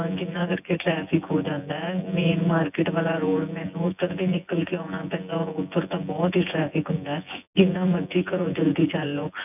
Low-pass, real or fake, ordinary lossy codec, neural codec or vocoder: 3.6 kHz; fake; none; vocoder, 24 kHz, 100 mel bands, Vocos